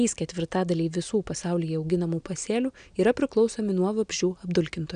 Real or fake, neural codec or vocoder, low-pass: real; none; 9.9 kHz